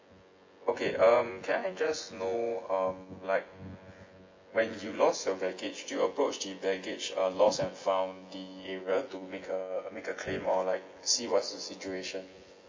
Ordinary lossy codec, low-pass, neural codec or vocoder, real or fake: MP3, 32 kbps; 7.2 kHz; vocoder, 24 kHz, 100 mel bands, Vocos; fake